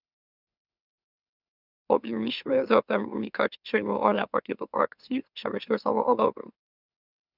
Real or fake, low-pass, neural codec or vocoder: fake; 5.4 kHz; autoencoder, 44.1 kHz, a latent of 192 numbers a frame, MeloTTS